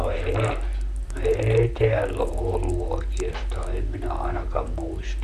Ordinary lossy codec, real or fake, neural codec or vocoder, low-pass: none; fake; vocoder, 44.1 kHz, 128 mel bands, Pupu-Vocoder; 14.4 kHz